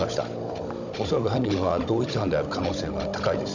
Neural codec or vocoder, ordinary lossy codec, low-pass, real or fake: codec, 16 kHz, 16 kbps, FunCodec, trained on Chinese and English, 50 frames a second; none; 7.2 kHz; fake